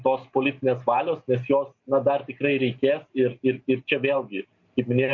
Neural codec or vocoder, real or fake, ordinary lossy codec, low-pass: none; real; MP3, 48 kbps; 7.2 kHz